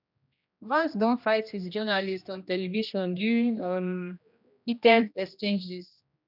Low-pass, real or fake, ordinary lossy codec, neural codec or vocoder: 5.4 kHz; fake; none; codec, 16 kHz, 1 kbps, X-Codec, HuBERT features, trained on general audio